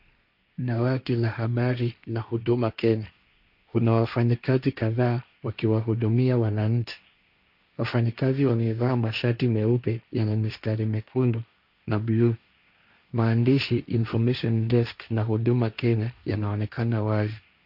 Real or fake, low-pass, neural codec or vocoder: fake; 5.4 kHz; codec, 16 kHz, 1.1 kbps, Voila-Tokenizer